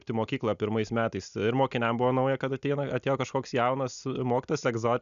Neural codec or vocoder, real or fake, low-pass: none; real; 7.2 kHz